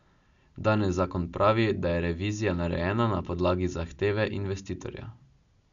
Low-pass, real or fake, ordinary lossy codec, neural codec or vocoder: 7.2 kHz; real; none; none